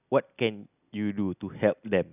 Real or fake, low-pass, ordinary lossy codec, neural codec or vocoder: real; 3.6 kHz; none; none